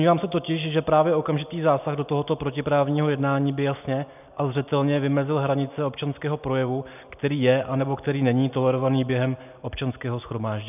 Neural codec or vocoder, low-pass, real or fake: none; 3.6 kHz; real